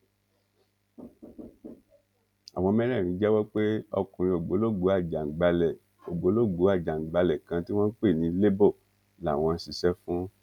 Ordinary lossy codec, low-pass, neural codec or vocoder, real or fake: none; 19.8 kHz; none; real